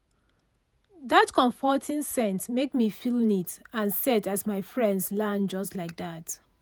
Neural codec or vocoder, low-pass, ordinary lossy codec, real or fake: vocoder, 48 kHz, 128 mel bands, Vocos; none; none; fake